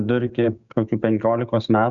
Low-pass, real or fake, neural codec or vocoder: 7.2 kHz; fake; codec, 16 kHz, 6 kbps, DAC